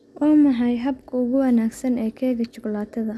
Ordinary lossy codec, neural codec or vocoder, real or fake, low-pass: none; none; real; none